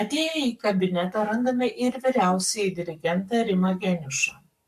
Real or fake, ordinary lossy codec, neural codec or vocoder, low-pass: fake; MP3, 96 kbps; codec, 44.1 kHz, 7.8 kbps, Pupu-Codec; 14.4 kHz